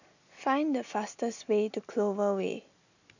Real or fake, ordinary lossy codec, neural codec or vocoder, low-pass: real; none; none; 7.2 kHz